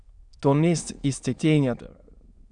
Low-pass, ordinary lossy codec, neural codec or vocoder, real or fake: 9.9 kHz; Opus, 64 kbps; autoencoder, 22.05 kHz, a latent of 192 numbers a frame, VITS, trained on many speakers; fake